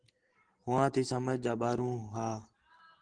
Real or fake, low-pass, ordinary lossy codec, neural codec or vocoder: real; 9.9 kHz; Opus, 16 kbps; none